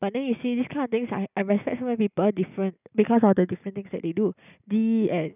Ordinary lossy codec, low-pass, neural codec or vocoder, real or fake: none; 3.6 kHz; none; real